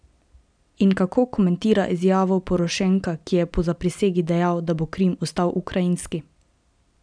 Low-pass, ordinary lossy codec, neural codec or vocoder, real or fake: 9.9 kHz; none; none; real